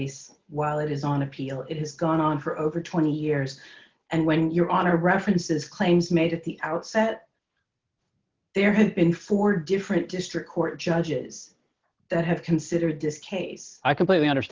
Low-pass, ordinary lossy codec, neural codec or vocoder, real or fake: 7.2 kHz; Opus, 32 kbps; none; real